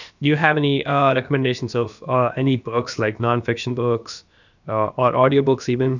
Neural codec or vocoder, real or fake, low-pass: codec, 16 kHz, about 1 kbps, DyCAST, with the encoder's durations; fake; 7.2 kHz